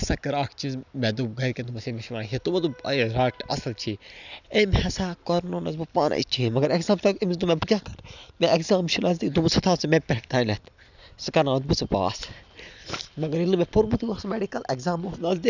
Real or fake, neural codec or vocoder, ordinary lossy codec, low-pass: real; none; none; 7.2 kHz